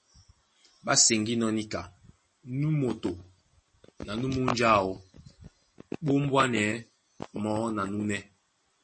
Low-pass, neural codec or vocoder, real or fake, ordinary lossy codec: 10.8 kHz; none; real; MP3, 32 kbps